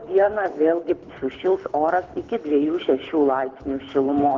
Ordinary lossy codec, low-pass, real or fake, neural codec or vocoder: Opus, 16 kbps; 7.2 kHz; fake; codec, 24 kHz, 6 kbps, HILCodec